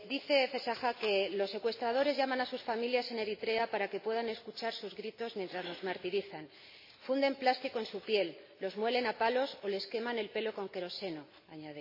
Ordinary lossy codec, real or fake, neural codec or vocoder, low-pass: MP3, 24 kbps; real; none; 5.4 kHz